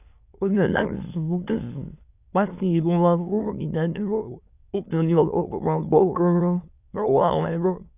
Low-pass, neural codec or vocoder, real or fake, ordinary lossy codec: 3.6 kHz; autoencoder, 22.05 kHz, a latent of 192 numbers a frame, VITS, trained on many speakers; fake; none